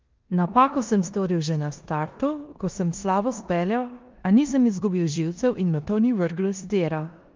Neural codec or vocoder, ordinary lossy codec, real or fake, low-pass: codec, 16 kHz in and 24 kHz out, 0.9 kbps, LongCat-Audio-Codec, four codebook decoder; Opus, 24 kbps; fake; 7.2 kHz